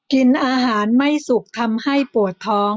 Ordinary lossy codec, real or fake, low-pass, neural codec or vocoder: none; real; none; none